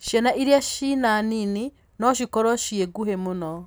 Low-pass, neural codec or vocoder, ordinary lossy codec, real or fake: none; none; none; real